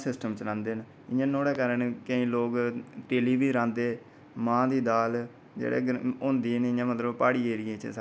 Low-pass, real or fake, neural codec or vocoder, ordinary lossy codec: none; real; none; none